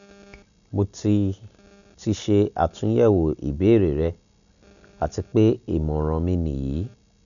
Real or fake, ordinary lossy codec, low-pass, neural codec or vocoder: real; none; 7.2 kHz; none